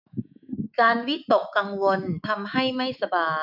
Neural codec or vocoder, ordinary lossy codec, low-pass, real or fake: autoencoder, 48 kHz, 128 numbers a frame, DAC-VAE, trained on Japanese speech; none; 5.4 kHz; fake